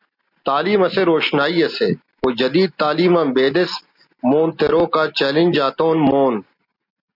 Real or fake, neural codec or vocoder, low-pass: real; none; 5.4 kHz